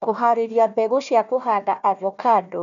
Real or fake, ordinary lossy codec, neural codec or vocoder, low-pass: fake; none; codec, 16 kHz, 1 kbps, FunCodec, trained on Chinese and English, 50 frames a second; 7.2 kHz